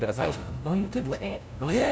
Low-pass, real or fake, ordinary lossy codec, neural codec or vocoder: none; fake; none; codec, 16 kHz, 0.5 kbps, FunCodec, trained on LibriTTS, 25 frames a second